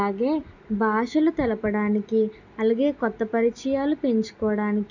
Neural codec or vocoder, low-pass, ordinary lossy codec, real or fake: none; 7.2 kHz; none; real